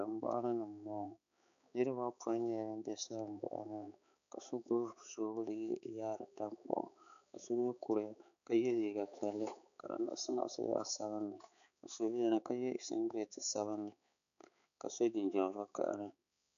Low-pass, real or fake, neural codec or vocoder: 7.2 kHz; fake; codec, 16 kHz, 4 kbps, X-Codec, HuBERT features, trained on balanced general audio